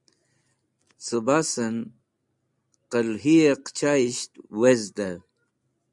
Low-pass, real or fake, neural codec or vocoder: 10.8 kHz; real; none